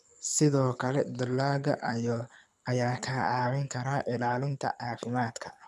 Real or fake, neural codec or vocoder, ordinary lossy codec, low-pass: fake; codec, 24 kHz, 6 kbps, HILCodec; none; none